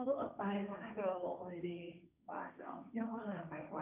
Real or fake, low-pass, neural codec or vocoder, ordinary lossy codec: fake; 3.6 kHz; codec, 16 kHz, 1.1 kbps, Voila-Tokenizer; none